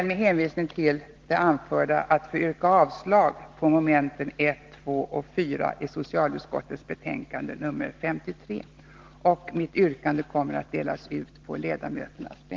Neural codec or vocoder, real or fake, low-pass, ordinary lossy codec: none; real; 7.2 kHz; Opus, 16 kbps